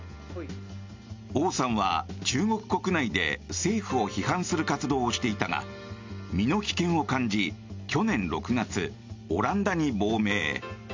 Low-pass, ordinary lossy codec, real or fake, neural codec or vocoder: 7.2 kHz; MP3, 64 kbps; real; none